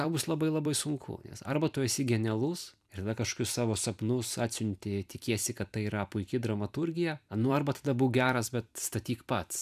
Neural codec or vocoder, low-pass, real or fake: vocoder, 44.1 kHz, 128 mel bands every 256 samples, BigVGAN v2; 14.4 kHz; fake